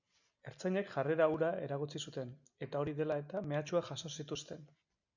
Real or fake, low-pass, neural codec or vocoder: real; 7.2 kHz; none